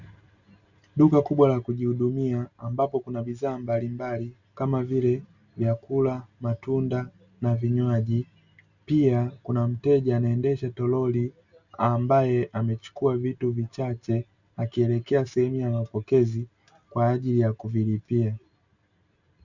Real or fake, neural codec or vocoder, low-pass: real; none; 7.2 kHz